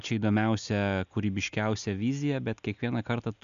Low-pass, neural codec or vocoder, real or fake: 7.2 kHz; none; real